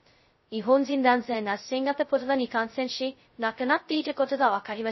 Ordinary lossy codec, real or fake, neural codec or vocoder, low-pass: MP3, 24 kbps; fake; codec, 16 kHz, 0.2 kbps, FocalCodec; 7.2 kHz